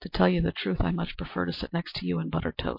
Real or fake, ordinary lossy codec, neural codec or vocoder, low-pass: real; MP3, 32 kbps; none; 5.4 kHz